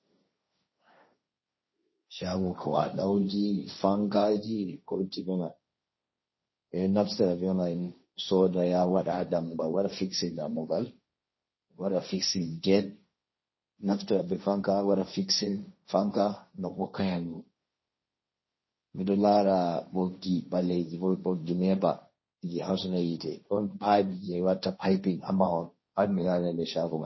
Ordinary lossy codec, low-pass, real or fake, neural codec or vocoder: MP3, 24 kbps; 7.2 kHz; fake; codec, 16 kHz, 1.1 kbps, Voila-Tokenizer